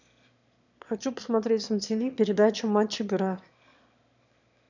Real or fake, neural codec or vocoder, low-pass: fake; autoencoder, 22.05 kHz, a latent of 192 numbers a frame, VITS, trained on one speaker; 7.2 kHz